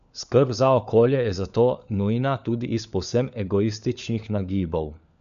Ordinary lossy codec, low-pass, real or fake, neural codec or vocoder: none; 7.2 kHz; fake; codec, 16 kHz, 4 kbps, FunCodec, trained on LibriTTS, 50 frames a second